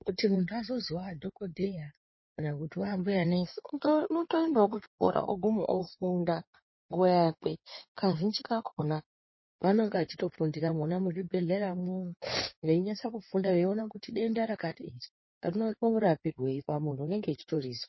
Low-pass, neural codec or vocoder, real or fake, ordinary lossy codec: 7.2 kHz; codec, 16 kHz in and 24 kHz out, 2.2 kbps, FireRedTTS-2 codec; fake; MP3, 24 kbps